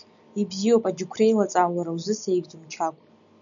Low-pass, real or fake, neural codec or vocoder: 7.2 kHz; real; none